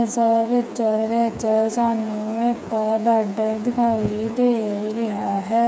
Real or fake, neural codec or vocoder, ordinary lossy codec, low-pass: fake; codec, 16 kHz, 4 kbps, FreqCodec, smaller model; none; none